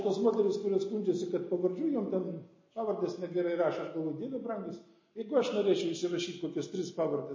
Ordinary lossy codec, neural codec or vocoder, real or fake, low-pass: MP3, 32 kbps; none; real; 7.2 kHz